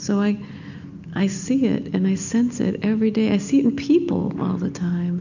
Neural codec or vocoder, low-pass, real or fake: none; 7.2 kHz; real